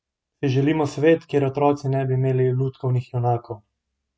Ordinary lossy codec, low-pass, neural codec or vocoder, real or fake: none; none; none; real